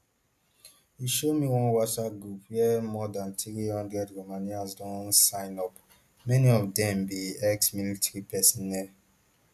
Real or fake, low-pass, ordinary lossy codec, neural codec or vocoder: real; 14.4 kHz; none; none